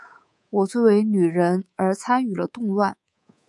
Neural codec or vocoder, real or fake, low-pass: codec, 24 kHz, 3.1 kbps, DualCodec; fake; 10.8 kHz